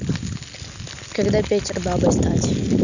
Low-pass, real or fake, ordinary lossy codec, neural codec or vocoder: 7.2 kHz; real; none; none